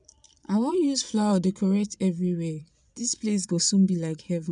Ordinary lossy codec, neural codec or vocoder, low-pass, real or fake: none; vocoder, 22.05 kHz, 80 mel bands, Vocos; 9.9 kHz; fake